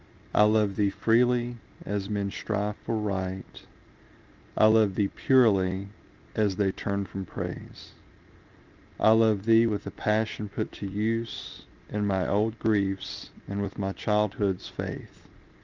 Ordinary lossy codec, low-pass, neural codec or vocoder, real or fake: Opus, 24 kbps; 7.2 kHz; none; real